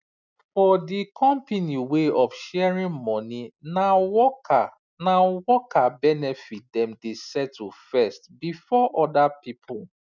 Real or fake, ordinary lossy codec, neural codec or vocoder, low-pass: real; none; none; 7.2 kHz